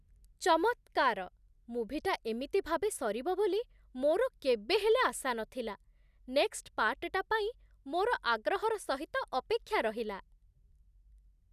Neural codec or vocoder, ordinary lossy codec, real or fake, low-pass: none; none; real; 14.4 kHz